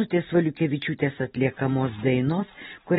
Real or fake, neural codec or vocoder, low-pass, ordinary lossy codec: real; none; 19.8 kHz; AAC, 16 kbps